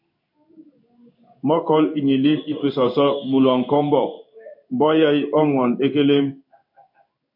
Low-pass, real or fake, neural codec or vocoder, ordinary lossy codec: 5.4 kHz; fake; codec, 16 kHz in and 24 kHz out, 1 kbps, XY-Tokenizer; MP3, 48 kbps